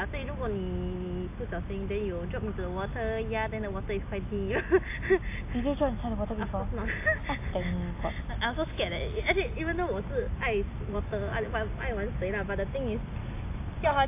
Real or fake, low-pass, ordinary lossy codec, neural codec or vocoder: real; 3.6 kHz; AAC, 24 kbps; none